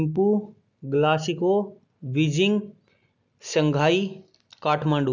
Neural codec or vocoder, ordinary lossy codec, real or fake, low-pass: none; none; real; 7.2 kHz